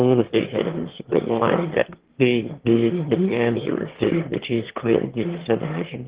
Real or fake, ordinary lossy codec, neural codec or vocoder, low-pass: fake; Opus, 16 kbps; autoencoder, 22.05 kHz, a latent of 192 numbers a frame, VITS, trained on one speaker; 3.6 kHz